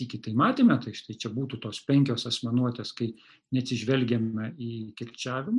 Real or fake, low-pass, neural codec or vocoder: real; 10.8 kHz; none